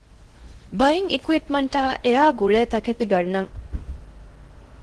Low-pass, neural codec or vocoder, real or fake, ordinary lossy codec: 10.8 kHz; codec, 16 kHz in and 24 kHz out, 0.6 kbps, FocalCodec, streaming, 4096 codes; fake; Opus, 16 kbps